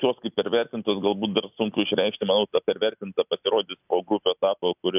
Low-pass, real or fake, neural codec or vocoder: 3.6 kHz; real; none